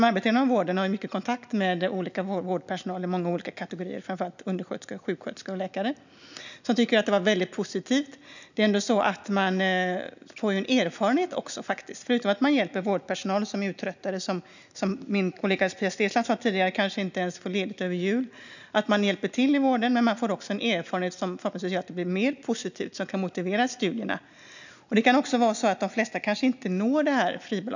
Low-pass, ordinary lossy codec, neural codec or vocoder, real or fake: 7.2 kHz; none; none; real